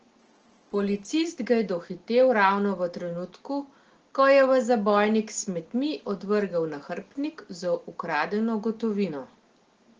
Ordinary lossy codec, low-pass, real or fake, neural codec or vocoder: Opus, 16 kbps; 7.2 kHz; real; none